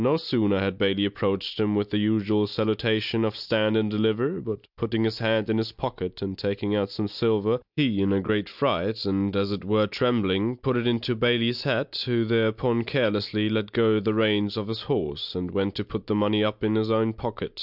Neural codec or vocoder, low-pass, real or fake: none; 5.4 kHz; real